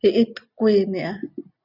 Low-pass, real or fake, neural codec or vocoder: 5.4 kHz; real; none